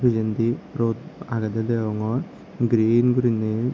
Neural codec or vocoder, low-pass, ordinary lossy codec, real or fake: none; none; none; real